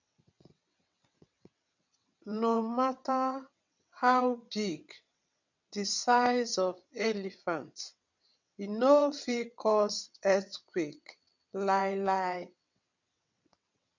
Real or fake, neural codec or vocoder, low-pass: fake; vocoder, 22.05 kHz, 80 mel bands, WaveNeXt; 7.2 kHz